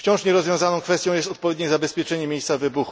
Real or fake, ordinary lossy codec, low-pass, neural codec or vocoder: real; none; none; none